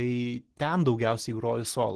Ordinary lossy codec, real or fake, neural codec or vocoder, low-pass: Opus, 16 kbps; real; none; 10.8 kHz